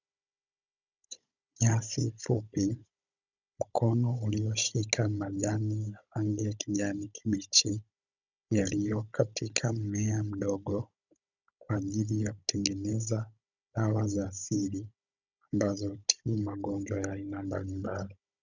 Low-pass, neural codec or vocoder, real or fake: 7.2 kHz; codec, 16 kHz, 16 kbps, FunCodec, trained on Chinese and English, 50 frames a second; fake